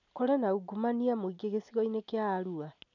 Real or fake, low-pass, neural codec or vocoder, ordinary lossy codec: real; 7.2 kHz; none; none